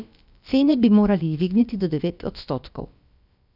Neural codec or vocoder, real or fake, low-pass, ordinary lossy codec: codec, 16 kHz, about 1 kbps, DyCAST, with the encoder's durations; fake; 5.4 kHz; none